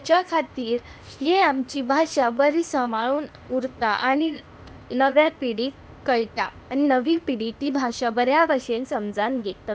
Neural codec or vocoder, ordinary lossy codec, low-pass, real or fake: codec, 16 kHz, 0.8 kbps, ZipCodec; none; none; fake